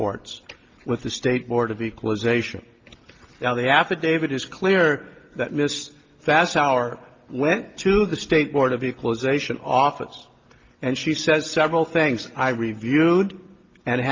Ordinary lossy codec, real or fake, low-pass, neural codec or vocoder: Opus, 32 kbps; real; 7.2 kHz; none